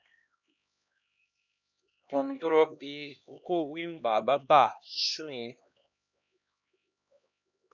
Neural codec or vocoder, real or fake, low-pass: codec, 16 kHz, 1 kbps, X-Codec, HuBERT features, trained on LibriSpeech; fake; 7.2 kHz